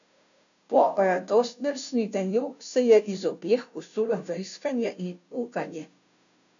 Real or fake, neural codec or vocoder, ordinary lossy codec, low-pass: fake; codec, 16 kHz, 0.5 kbps, FunCodec, trained on Chinese and English, 25 frames a second; none; 7.2 kHz